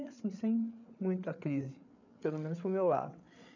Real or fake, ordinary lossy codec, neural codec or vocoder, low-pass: fake; none; codec, 16 kHz, 8 kbps, FreqCodec, larger model; 7.2 kHz